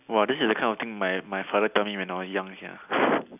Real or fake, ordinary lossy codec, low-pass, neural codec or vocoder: real; none; 3.6 kHz; none